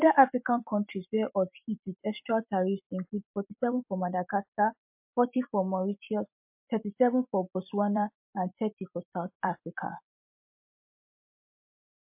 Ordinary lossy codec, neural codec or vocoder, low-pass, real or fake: MP3, 32 kbps; vocoder, 44.1 kHz, 128 mel bands every 256 samples, BigVGAN v2; 3.6 kHz; fake